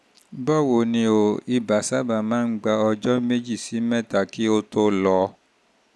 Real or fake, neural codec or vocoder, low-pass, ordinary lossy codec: real; none; none; none